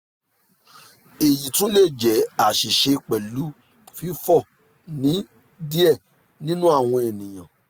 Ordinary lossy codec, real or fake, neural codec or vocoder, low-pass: none; real; none; none